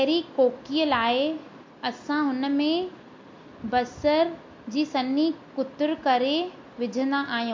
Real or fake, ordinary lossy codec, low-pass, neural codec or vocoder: real; MP3, 48 kbps; 7.2 kHz; none